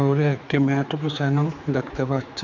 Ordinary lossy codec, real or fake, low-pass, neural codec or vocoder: none; fake; 7.2 kHz; codec, 16 kHz, 4 kbps, X-Codec, HuBERT features, trained on general audio